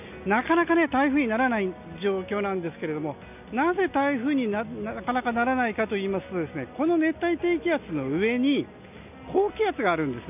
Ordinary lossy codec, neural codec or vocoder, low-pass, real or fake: none; none; 3.6 kHz; real